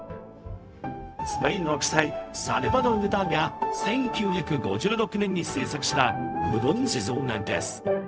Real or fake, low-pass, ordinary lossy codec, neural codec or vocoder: fake; none; none; codec, 16 kHz, 0.4 kbps, LongCat-Audio-Codec